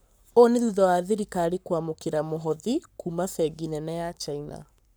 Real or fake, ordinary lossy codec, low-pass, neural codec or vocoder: fake; none; none; codec, 44.1 kHz, 7.8 kbps, Pupu-Codec